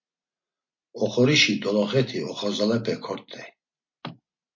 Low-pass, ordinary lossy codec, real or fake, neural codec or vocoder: 7.2 kHz; MP3, 32 kbps; real; none